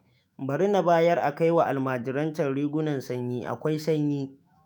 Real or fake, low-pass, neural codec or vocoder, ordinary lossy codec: fake; none; autoencoder, 48 kHz, 128 numbers a frame, DAC-VAE, trained on Japanese speech; none